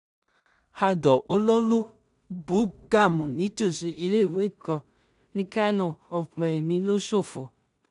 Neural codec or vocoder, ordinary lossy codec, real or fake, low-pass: codec, 16 kHz in and 24 kHz out, 0.4 kbps, LongCat-Audio-Codec, two codebook decoder; none; fake; 10.8 kHz